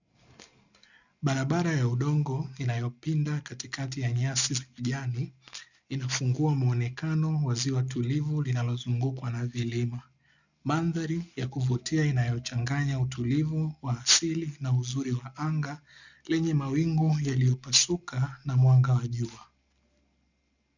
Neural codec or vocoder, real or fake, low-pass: none; real; 7.2 kHz